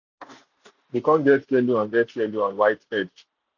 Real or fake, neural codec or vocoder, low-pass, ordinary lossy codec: real; none; 7.2 kHz; none